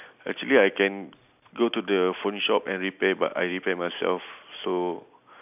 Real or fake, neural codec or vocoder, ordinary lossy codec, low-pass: real; none; none; 3.6 kHz